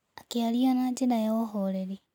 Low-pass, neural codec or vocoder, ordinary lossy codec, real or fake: 19.8 kHz; none; none; real